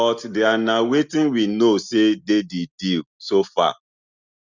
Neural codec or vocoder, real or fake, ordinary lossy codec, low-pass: none; real; Opus, 64 kbps; 7.2 kHz